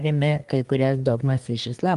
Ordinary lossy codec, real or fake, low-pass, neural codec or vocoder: Opus, 32 kbps; fake; 10.8 kHz; codec, 24 kHz, 1 kbps, SNAC